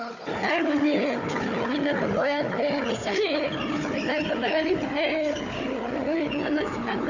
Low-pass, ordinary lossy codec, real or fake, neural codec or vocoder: 7.2 kHz; none; fake; codec, 16 kHz, 4 kbps, FunCodec, trained on Chinese and English, 50 frames a second